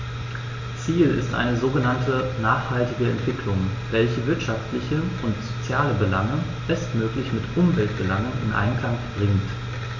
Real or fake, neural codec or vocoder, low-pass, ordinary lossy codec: real; none; 7.2 kHz; MP3, 48 kbps